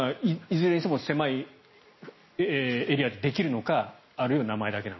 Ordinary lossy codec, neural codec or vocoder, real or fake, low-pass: MP3, 24 kbps; none; real; 7.2 kHz